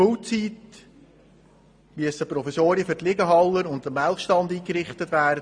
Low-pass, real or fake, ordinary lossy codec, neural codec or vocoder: 9.9 kHz; real; MP3, 64 kbps; none